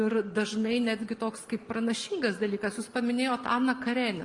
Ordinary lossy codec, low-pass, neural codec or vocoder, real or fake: Opus, 32 kbps; 10.8 kHz; none; real